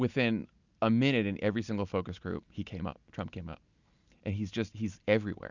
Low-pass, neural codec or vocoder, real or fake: 7.2 kHz; none; real